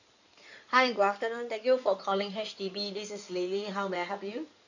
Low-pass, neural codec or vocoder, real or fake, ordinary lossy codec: 7.2 kHz; codec, 16 kHz in and 24 kHz out, 2.2 kbps, FireRedTTS-2 codec; fake; none